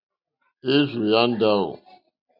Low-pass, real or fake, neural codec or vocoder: 5.4 kHz; real; none